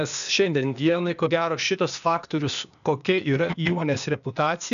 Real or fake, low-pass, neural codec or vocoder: fake; 7.2 kHz; codec, 16 kHz, 0.8 kbps, ZipCodec